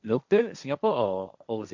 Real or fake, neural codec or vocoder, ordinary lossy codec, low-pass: fake; codec, 16 kHz, 1.1 kbps, Voila-Tokenizer; none; 7.2 kHz